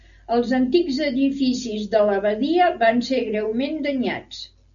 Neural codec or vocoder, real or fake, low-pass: none; real; 7.2 kHz